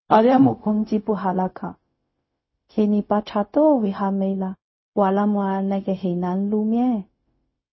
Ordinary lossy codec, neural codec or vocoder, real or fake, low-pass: MP3, 24 kbps; codec, 16 kHz, 0.4 kbps, LongCat-Audio-Codec; fake; 7.2 kHz